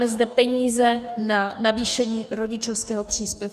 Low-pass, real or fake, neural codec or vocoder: 14.4 kHz; fake; codec, 44.1 kHz, 2.6 kbps, DAC